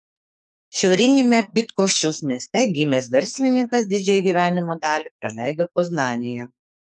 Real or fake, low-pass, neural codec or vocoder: fake; 10.8 kHz; codec, 44.1 kHz, 2.6 kbps, SNAC